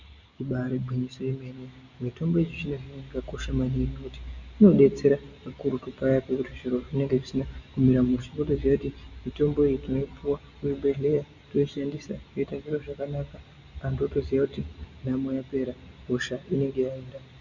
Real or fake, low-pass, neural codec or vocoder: real; 7.2 kHz; none